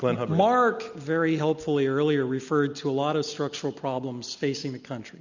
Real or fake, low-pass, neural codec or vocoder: real; 7.2 kHz; none